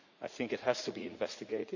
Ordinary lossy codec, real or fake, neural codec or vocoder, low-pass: none; fake; vocoder, 44.1 kHz, 80 mel bands, Vocos; 7.2 kHz